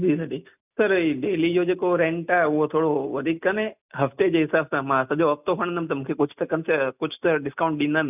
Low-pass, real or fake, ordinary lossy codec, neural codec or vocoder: 3.6 kHz; real; none; none